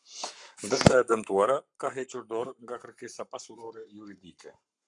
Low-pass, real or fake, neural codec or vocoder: 10.8 kHz; fake; codec, 44.1 kHz, 7.8 kbps, Pupu-Codec